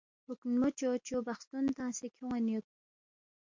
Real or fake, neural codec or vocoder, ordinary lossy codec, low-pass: real; none; MP3, 48 kbps; 7.2 kHz